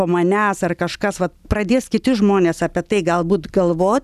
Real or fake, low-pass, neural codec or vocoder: real; 14.4 kHz; none